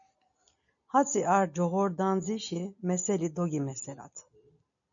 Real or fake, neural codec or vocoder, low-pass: real; none; 7.2 kHz